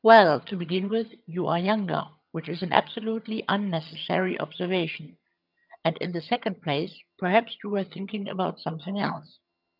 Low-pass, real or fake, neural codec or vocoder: 5.4 kHz; fake; vocoder, 22.05 kHz, 80 mel bands, HiFi-GAN